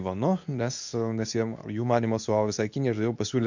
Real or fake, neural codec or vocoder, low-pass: fake; codec, 24 kHz, 0.9 kbps, WavTokenizer, medium speech release version 2; 7.2 kHz